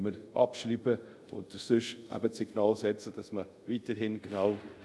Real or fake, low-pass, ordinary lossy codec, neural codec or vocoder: fake; none; none; codec, 24 kHz, 0.5 kbps, DualCodec